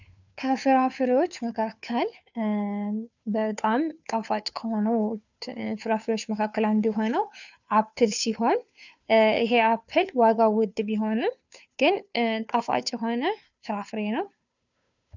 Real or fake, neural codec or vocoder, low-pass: fake; codec, 16 kHz, 2 kbps, FunCodec, trained on Chinese and English, 25 frames a second; 7.2 kHz